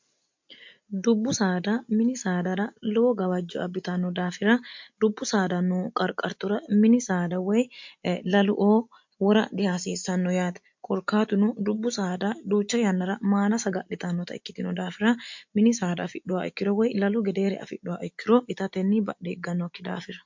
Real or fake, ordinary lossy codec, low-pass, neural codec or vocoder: real; MP3, 48 kbps; 7.2 kHz; none